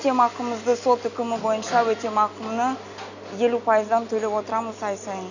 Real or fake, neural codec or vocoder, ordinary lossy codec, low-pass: real; none; none; 7.2 kHz